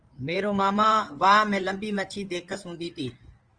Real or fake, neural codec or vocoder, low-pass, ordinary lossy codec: fake; codec, 16 kHz in and 24 kHz out, 2.2 kbps, FireRedTTS-2 codec; 9.9 kHz; Opus, 24 kbps